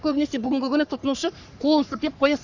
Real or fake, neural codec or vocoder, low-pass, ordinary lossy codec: fake; codec, 44.1 kHz, 3.4 kbps, Pupu-Codec; 7.2 kHz; none